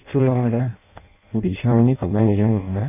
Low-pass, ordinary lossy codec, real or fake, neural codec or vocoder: 3.6 kHz; none; fake; codec, 16 kHz in and 24 kHz out, 0.6 kbps, FireRedTTS-2 codec